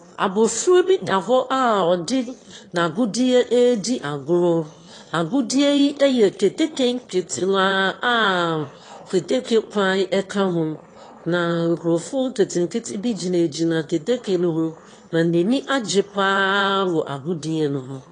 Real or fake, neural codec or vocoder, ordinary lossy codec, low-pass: fake; autoencoder, 22.05 kHz, a latent of 192 numbers a frame, VITS, trained on one speaker; AAC, 32 kbps; 9.9 kHz